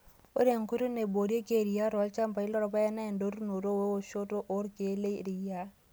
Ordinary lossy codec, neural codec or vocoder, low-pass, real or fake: none; none; none; real